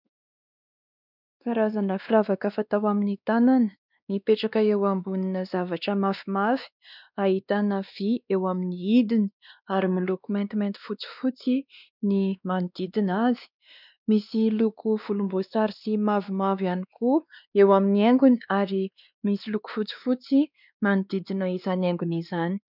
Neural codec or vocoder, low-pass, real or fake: codec, 16 kHz, 2 kbps, X-Codec, WavLM features, trained on Multilingual LibriSpeech; 5.4 kHz; fake